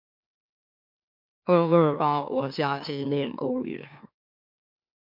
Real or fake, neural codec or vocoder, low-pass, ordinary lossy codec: fake; autoencoder, 44.1 kHz, a latent of 192 numbers a frame, MeloTTS; 5.4 kHz; MP3, 48 kbps